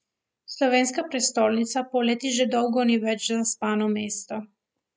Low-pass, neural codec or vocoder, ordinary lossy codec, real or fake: none; none; none; real